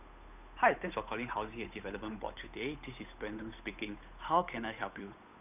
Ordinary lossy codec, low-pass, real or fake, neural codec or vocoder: none; 3.6 kHz; fake; codec, 16 kHz, 8 kbps, FunCodec, trained on Chinese and English, 25 frames a second